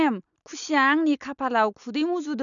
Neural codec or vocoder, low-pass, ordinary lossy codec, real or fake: none; 7.2 kHz; none; real